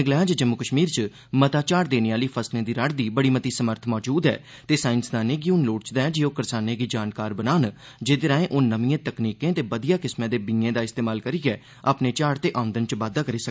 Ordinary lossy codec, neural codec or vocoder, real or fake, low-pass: none; none; real; none